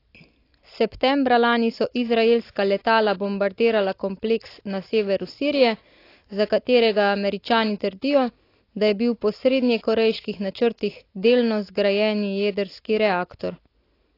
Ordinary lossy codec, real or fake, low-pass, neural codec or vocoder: AAC, 32 kbps; real; 5.4 kHz; none